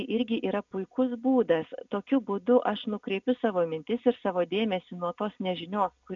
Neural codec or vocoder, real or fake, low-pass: none; real; 7.2 kHz